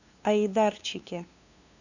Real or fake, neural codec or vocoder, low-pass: fake; codec, 16 kHz, 2 kbps, FunCodec, trained on LibriTTS, 25 frames a second; 7.2 kHz